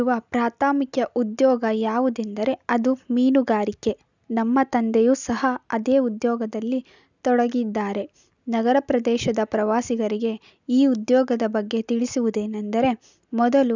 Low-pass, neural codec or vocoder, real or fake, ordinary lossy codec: 7.2 kHz; none; real; none